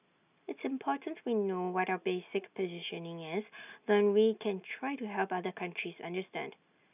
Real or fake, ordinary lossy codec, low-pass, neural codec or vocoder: real; none; 3.6 kHz; none